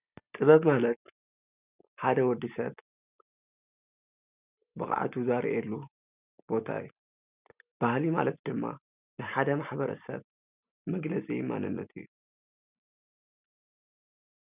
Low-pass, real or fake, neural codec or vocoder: 3.6 kHz; real; none